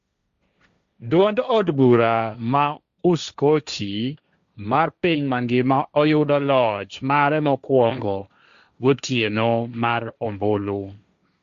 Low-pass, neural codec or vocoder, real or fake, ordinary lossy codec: 7.2 kHz; codec, 16 kHz, 1.1 kbps, Voila-Tokenizer; fake; Opus, 64 kbps